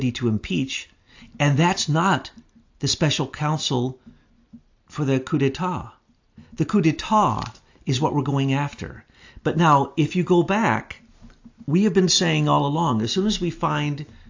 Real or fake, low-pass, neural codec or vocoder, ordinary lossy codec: real; 7.2 kHz; none; AAC, 48 kbps